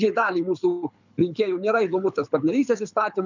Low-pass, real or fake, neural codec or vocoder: 7.2 kHz; fake; codec, 24 kHz, 6 kbps, HILCodec